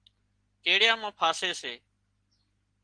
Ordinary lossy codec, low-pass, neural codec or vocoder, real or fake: Opus, 16 kbps; 9.9 kHz; none; real